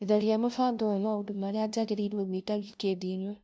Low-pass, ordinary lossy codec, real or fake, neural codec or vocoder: none; none; fake; codec, 16 kHz, 0.5 kbps, FunCodec, trained on LibriTTS, 25 frames a second